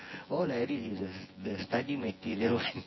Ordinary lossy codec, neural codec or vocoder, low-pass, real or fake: MP3, 24 kbps; vocoder, 24 kHz, 100 mel bands, Vocos; 7.2 kHz; fake